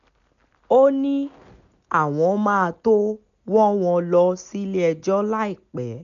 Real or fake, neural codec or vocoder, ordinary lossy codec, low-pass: real; none; none; 7.2 kHz